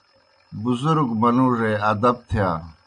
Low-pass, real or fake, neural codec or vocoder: 9.9 kHz; real; none